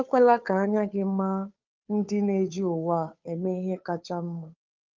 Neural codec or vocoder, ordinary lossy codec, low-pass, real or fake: codec, 16 kHz, 2 kbps, FunCodec, trained on Chinese and English, 25 frames a second; Opus, 24 kbps; 7.2 kHz; fake